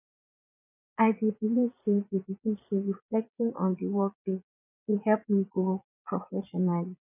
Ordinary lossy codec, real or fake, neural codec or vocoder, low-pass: none; fake; vocoder, 22.05 kHz, 80 mel bands, Vocos; 3.6 kHz